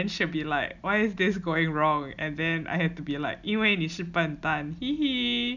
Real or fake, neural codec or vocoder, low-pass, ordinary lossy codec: real; none; 7.2 kHz; none